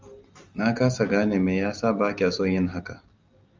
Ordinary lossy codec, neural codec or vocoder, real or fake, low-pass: Opus, 32 kbps; none; real; 7.2 kHz